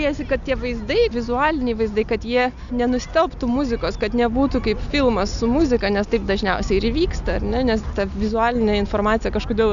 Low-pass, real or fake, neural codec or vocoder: 7.2 kHz; real; none